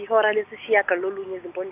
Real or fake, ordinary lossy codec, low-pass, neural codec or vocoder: real; none; 3.6 kHz; none